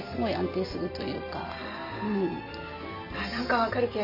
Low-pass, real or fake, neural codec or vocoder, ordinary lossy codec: 5.4 kHz; real; none; none